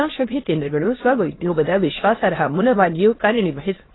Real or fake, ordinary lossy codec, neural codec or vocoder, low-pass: fake; AAC, 16 kbps; autoencoder, 22.05 kHz, a latent of 192 numbers a frame, VITS, trained on many speakers; 7.2 kHz